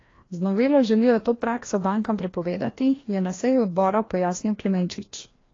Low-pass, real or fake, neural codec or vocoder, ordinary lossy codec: 7.2 kHz; fake; codec, 16 kHz, 1 kbps, FreqCodec, larger model; AAC, 32 kbps